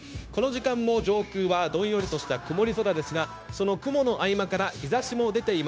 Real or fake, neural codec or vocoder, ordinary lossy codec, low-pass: fake; codec, 16 kHz, 0.9 kbps, LongCat-Audio-Codec; none; none